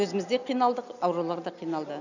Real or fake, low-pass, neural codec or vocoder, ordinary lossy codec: real; 7.2 kHz; none; none